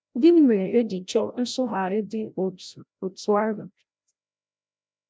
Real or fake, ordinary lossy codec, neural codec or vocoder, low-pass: fake; none; codec, 16 kHz, 0.5 kbps, FreqCodec, larger model; none